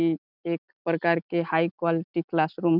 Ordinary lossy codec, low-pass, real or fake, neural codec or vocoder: none; 5.4 kHz; real; none